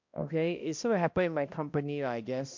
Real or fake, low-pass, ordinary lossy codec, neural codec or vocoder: fake; 7.2 kHz; MP3, 48 kbps; codec, 16 kHz, 1 kbps, X-Codec, HuBERT features, trained on balanced general audio